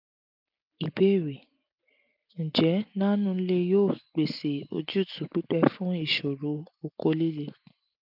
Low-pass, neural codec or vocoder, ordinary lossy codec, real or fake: 5.4 kHz; none; none; real